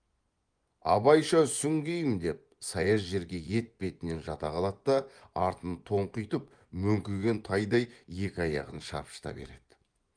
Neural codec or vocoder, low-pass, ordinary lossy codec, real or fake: vocoder, 24 kHz, 100 mel bands, Vocos; 9.9 kHz; Opus, 32 kbps; fake